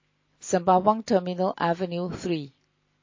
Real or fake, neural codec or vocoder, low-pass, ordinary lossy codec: real; none; 7.2 kHz; MP3, 32 kbps